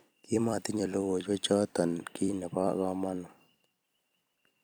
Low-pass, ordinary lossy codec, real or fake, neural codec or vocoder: none; none; fake; vocoder, 44.1 kHz, 128 mel bands every 256 samples, BigVGAN v2